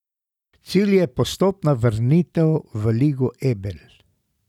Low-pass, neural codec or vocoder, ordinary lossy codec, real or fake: 19.8 kHz; none; none; real